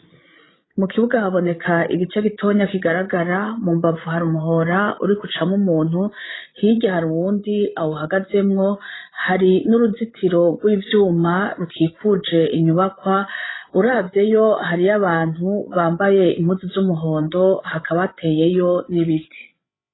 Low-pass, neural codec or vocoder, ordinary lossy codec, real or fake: 7.2 kHz; codec, 16 kHz, 16 kbps, FreqCodec, larger model; AAC, 16 kbps; fake